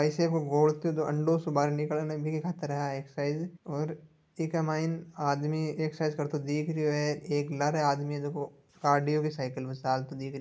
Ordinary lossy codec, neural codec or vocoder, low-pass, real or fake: none; none; none; real